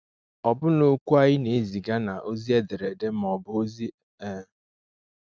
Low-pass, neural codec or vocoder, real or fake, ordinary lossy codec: none; none; real; none